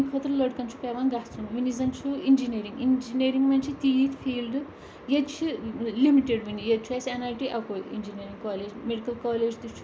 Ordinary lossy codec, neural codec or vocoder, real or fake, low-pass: none; none; real; none